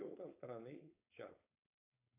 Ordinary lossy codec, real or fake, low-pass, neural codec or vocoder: AAC, 32 kbps; fake; 3.6 kHz; codec, 16 kHz, 4.8 kbps, FACodec